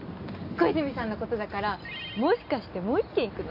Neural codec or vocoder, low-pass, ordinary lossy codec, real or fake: none; 5.4 kHz; AAC, 48 kbps; real